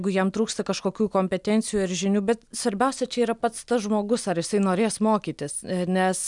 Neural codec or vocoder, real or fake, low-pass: none; real; 10.8 kHz